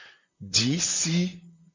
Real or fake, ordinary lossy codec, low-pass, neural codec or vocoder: fake; MP3, 64 kbps; 7.2 kHz; vocoder, 44.1 kHz, 128 mel bands, Pupu-Vocoder